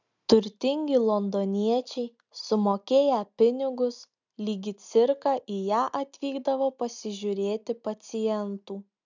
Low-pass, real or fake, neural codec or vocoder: 7.2 kHz; real; none